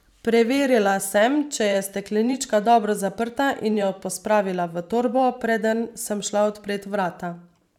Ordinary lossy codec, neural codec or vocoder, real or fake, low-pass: none; vocoder, 44.1 kHz, 128 mel bands every 512 samples, BigVGAN v2; fake; 19.8 kHz